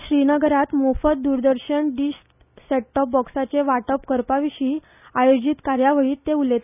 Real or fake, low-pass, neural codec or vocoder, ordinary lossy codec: real; 3.6 kHz; none; none